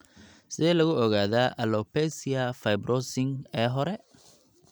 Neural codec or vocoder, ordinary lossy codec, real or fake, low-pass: none; none; real; none